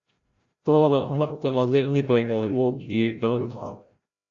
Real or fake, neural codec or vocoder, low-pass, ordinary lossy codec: fake; codec, 16 kHz, 0.5 kbps, FreqCodec, larger model; 7.2 kHz; Opus, 64 kbps